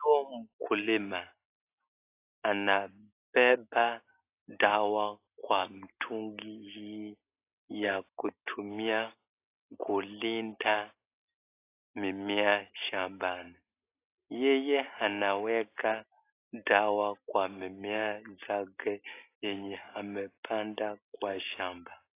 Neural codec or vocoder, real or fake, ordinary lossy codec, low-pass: none; real; AAC, 24 kbps; 3.6 kHz